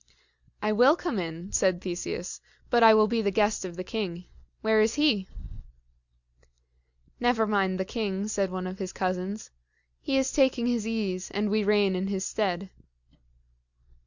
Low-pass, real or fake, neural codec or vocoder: 7.2 kHz; real; none